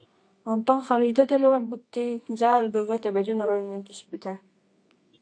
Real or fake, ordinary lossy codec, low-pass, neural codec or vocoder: fake; AAC, 48 kbps; 9.9 kHz; codec, 24 kHz, 0.9 kbps, WavTokenizer, medium music audio release